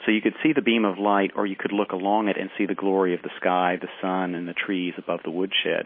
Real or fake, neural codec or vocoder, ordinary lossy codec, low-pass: real; none; MP3, 24 kbps; 5.4 kHz